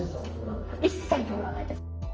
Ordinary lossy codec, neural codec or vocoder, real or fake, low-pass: Opus, 24 kbps; codec, 44.1 kHz, 2.6 kbps, SNAC; fake; 7.2 kHz